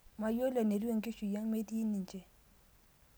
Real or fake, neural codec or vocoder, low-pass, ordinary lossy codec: real; none; none; none